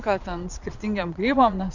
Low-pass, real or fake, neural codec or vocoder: 7.2 kHz; fake; vocoder, 22.05 kHz, 80 mel bands, Vocos